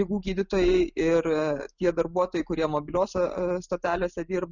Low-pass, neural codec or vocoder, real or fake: 7.2 kHz; none; real